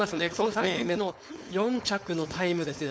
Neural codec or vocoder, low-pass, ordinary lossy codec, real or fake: codec, 16 kHz, 4.8 kbps, FACodec; none; none; fake